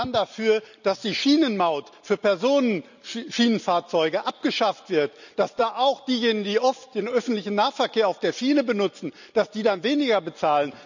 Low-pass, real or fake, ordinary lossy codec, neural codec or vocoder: 7.2 kHz; real; none; none